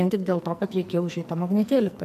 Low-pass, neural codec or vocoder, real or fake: 14.4 kHz; codec, 44.1 kHz, 2.6 kbps, SNAC; fake